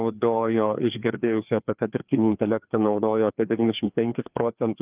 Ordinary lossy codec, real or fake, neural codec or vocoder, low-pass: Opus, 24 kbps; fake; codec, 16 kHz, 2 kbps, FreqCodec, larger model; 3.6 kHz